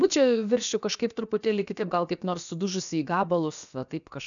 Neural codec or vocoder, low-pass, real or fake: codec, 16 kHz, about 1 kbps, DyCAST, with the encoder's durations; 7.2 kHz; fake